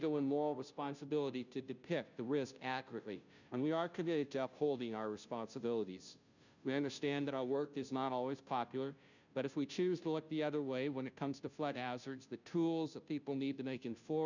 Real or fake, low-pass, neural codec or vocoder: fake; 7.2 kHz; codec, 16 kHz, 0.5 kbps, FunCodec, trained on Chinese and English, 25 frames a second